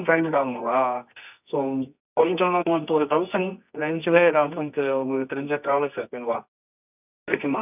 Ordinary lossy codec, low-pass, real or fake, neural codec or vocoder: none; 3.6 kHz; fake; codec, 24 kHz, 0.9 kbps, WavTokenizer, medium music audio release